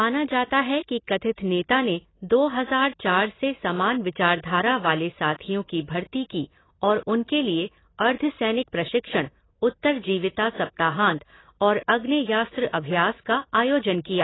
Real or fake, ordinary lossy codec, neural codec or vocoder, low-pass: real; AAC, 16 kbps; none; 7.2 kHz